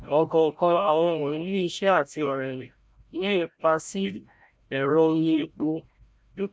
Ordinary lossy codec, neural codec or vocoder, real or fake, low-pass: none; codec, 16 kHz, 0.5 kbps, FreqCodec, larger model; fake; none